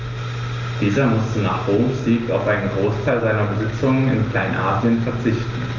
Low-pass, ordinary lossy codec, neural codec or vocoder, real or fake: 7.2 kHz; Opus, 32 kbps; none; real